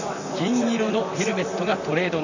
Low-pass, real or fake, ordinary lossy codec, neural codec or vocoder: 7.2 kHz; fake; none; vocoder, 44.1 kHz, 128 mel bands, Pupu-Vocoder